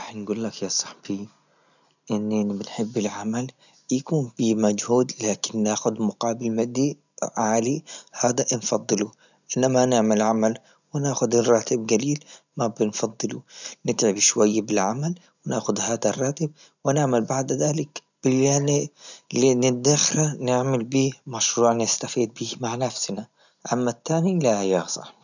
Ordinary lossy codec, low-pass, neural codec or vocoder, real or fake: none; 7.2 kHz; none; real